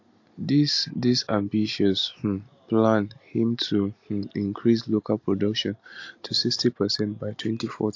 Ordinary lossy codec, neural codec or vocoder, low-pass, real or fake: AAC, 48 kbps; none; 7.2 kHz; real